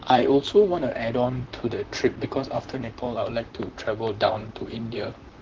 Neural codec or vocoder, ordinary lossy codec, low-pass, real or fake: vocoder, 44.1 kHz, 128 mel bands, Pupu-Vocoder; Opus, 16 kbps; 7.2 kHz; fake